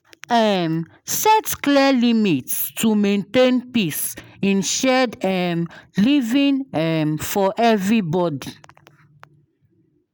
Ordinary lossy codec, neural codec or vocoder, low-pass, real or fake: none; none; none; real